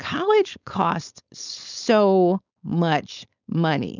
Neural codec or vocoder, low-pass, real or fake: codec, 16 kHz, 4.8 kbps, FACodec; 7.2 kHz; fake